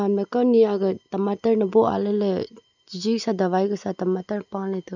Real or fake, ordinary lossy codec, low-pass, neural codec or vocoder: real; none; 7.2 kHz; none